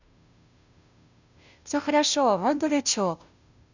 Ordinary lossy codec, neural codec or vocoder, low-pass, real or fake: none; codec, 16 kHz, 0.5 kbps, FunCodec, trained on Chinese and English, 25 frames a second; 7.2 kHz; fake